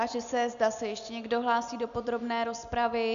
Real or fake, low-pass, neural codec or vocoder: real; 7.2 kHz; none